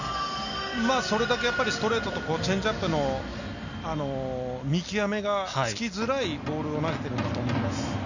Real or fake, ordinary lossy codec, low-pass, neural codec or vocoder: real; none; 7.2 kHz; none